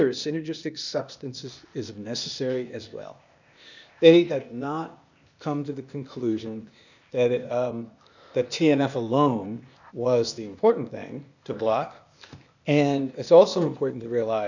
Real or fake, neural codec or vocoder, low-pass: fake; codec, 16 kHz, 0.8 kbps, ZipCodec; 7.2 kHz